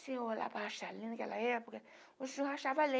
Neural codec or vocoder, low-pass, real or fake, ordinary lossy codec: none; none; real; none